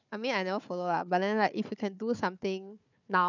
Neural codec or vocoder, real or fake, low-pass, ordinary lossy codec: codec, 16 kHz, 4 kbps, FunCodec, trained on Chinese and English, 50 frames a second; fake; 7.2 kHz; none